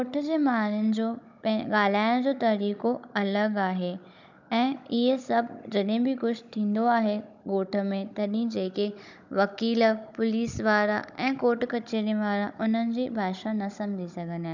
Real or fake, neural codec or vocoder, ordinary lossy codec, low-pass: fake; codec, 16 kHz, 16 kbps, FunCodec, trained on Chinese and English, 50 frames a second; none; 7.2 kHz